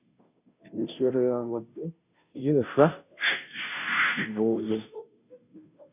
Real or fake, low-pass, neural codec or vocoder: fake; 3.6 kHz; codec, 16 kHz, 0.5 kbps, FunCodec, trained on Chinese and English, 25 frames a second